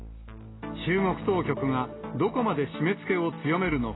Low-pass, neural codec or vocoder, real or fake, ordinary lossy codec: 7.2 kHz; none; real; AAC, 16 kbps